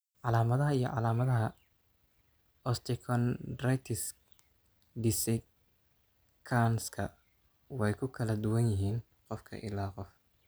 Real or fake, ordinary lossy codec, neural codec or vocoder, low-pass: real; none; none; none